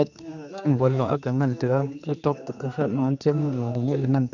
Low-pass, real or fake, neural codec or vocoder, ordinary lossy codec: 7.2 kHz; fake; codec, 16 kHz, 2 kbps, X-Codec, HuBERT features, trained on general audio; none